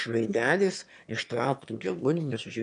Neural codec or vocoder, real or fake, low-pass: autoencoder, 22.05 kHz, a latent of 192 numbers a frame, VITS, trained on one speaker; fake; 9.9 kHz